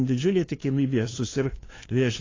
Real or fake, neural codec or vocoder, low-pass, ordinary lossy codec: fake; codec, 16 kHz, 2 kbps, FunCodec, trained on LibriTTS, 25 frames a second; 7.2 kHz; AAC, 32 kbps